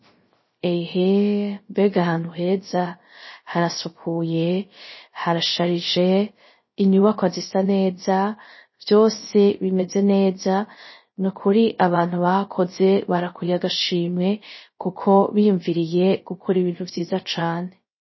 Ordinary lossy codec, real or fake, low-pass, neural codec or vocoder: MP3, 24 kbps; fake; 7.2 kHz; codec, 16 kHz, 0.3 kbps, FocalCodec